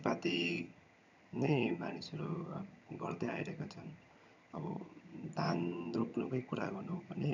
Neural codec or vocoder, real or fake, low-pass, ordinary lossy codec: vocoder, 22.05 kHz, 80 mel bands, HiFi-GAN; fake; 7.2 kHz; none